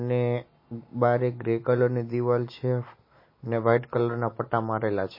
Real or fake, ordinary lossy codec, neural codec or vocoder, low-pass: real; MP3, 24 kbps; none; 5.4 kHz